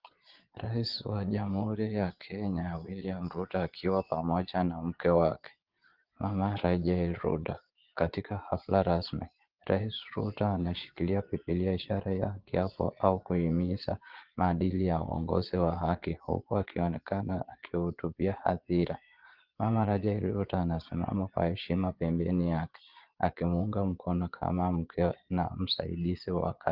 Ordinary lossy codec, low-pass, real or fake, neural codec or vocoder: Opus, 16 kbps; 5.4 kHz; fake; vocoder, 22.05 kHz, 80 mel bands, Vocos